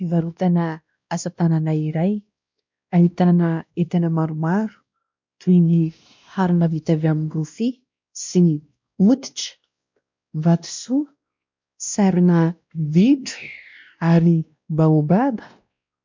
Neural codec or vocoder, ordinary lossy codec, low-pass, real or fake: codec, 16 kHz, 1 kbps, X-Codec, WavLM features, trained on Multilingual LibriSpeech; MP3, 64 kbps; 7.2 kHz; fake